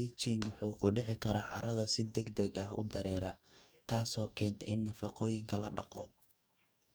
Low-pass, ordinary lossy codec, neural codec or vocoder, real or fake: none; none; codec, 44.1 kHz, 2.6 kbps, DAC; fake